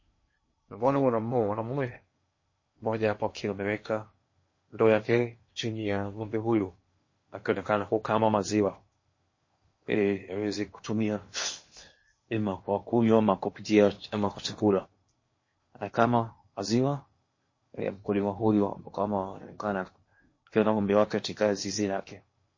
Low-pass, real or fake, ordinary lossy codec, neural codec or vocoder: 7.2 kHz; fake; MP3, 32 kbps; codec, 16 kHz in and 24 kHz out, 0.8 kbps, FocalCodec, streaming, 65536 codes